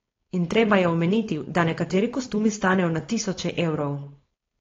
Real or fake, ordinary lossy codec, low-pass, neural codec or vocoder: fake; AAC, 32 kbps; 7.2 kHz; codec, 16 kHz, 4.8 kbps, FACodec